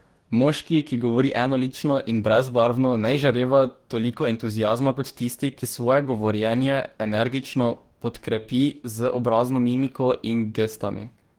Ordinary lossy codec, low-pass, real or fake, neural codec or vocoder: Opus, 24 kbps; 19.8 kHz; fake; codec, 44.1 kHz, 2.6 kbps, DAC